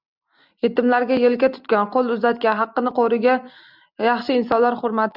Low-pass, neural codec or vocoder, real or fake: 5.4 kHz; none; real